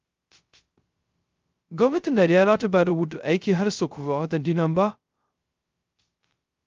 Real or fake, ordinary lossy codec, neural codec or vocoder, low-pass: fake; Opus, 32 kbps; codec, 16 kHz, 0.2 kbps, FocalCodec; 7.2 kHz